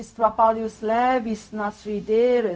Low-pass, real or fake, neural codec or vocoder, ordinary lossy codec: none; fake; codec, 16 kHz, 0.4 kbps, LongCat-Audio-Codec; none